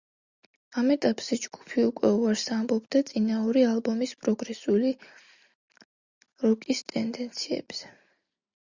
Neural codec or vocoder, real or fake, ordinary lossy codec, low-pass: none; real; Opus, 64 kbps; 7.2 kHz